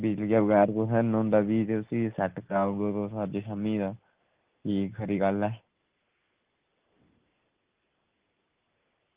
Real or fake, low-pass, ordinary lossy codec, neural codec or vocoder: real; 3.6 kHz; Opus, 32 kbps; none